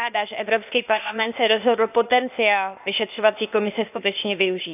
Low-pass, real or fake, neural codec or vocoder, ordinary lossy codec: 3.6 kHz; fake; codec, 16 kHz, 0.8 kbps, ZipCodec; none